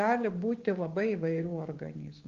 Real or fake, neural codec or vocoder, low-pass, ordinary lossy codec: fake; codec, 16 kHz, 6 kbps, DAC; 7.2 kHz; Opus, 24 kbps